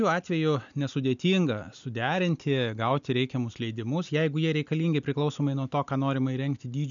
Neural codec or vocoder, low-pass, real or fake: none; 7.2 kHz; real